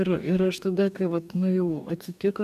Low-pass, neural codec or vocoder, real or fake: 14.4 kHz; codec, 44.1 kHz, 2.6 kbps, DAC; fake